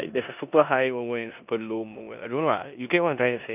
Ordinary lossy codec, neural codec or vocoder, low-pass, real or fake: none; codec, 16 kHz in and 24 kHz out, 0.9 kbps, LongCat-Audio-Codec, four codebook decoder; 3.6 kHz; fake